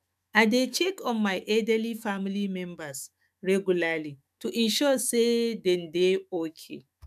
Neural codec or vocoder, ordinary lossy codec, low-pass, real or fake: autoencoder, 48 kHz, 128 numbers a frame, DAC-VAE, trained on Japanese speech; none; 14.4 kHz; fake